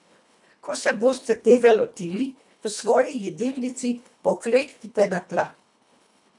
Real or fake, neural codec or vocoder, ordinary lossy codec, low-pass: fake; codec, 24 kHz, 1.5 kbps, HILCodec; none; 10.8 kHz